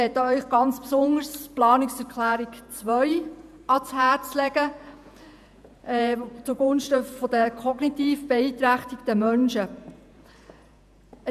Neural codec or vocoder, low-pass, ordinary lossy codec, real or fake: vocoder, 48 kHz, 128 mel bands, Vocos; 14.4 kHz; none; fake